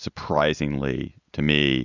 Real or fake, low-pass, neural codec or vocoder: real; 7.2 kHz; none